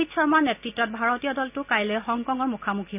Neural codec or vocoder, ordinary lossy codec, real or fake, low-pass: none; none; real; 3.6 kHz